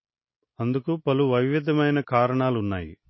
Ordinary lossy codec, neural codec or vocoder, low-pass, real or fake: MP3, 24 kbps; none; 7.2 kHz; real